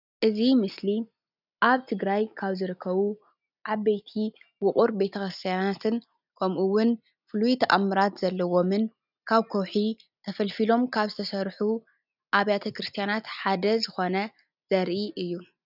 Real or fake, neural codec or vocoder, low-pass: real; none; 5.4 kHz